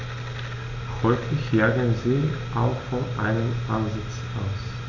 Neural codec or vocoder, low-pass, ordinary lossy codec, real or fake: none; 7.2 kHz; none; real